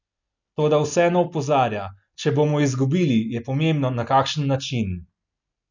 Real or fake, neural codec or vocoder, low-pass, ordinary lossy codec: real; none; 7.2 kHz; none